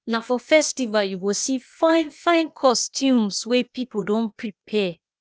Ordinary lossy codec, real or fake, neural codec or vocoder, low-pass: none; fake; codec, 16 kHz, 0.8 kbps, ZipCodec; none